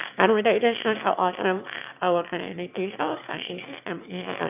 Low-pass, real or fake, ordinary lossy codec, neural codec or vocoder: 3.6 kHz; fake; none; autoencoder, 22.05 kHz, a latent of 192 numbers a frame, VITS, trained on one speaker